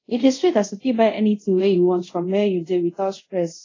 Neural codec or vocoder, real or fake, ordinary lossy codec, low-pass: codec, 24 kHz, 0.5 kbps, DualCodec; fake; AAC, 32 kbps; 7.2 kHz